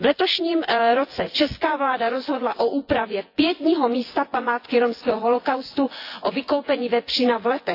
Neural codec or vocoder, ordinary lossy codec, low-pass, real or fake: vocoder, 24 kHz, 100 mel bands, Vocos; AAC, 32 kbps; 5.4 kHz; fake